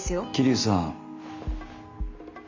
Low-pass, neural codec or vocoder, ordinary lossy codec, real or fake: 7.2 kHz; none; MP3, 48 kbps; real